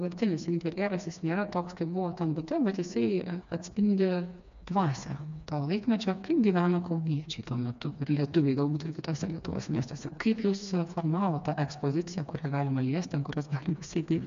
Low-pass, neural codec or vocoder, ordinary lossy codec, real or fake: 7.2 kHz; codec, 16 kHz, 2 kbps, FreqCodec, smaller model; MP3, 64 kbps; fake